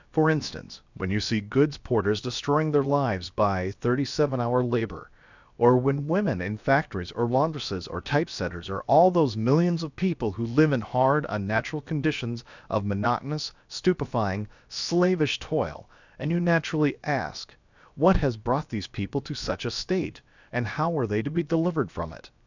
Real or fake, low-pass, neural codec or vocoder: fake; 7.2 kHz; codec, 16 kHz, 0.7 kbps, FocalCodec